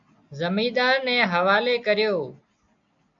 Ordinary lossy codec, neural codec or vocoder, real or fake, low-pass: AAC, 64 kbps; none; real; 7.2 kHz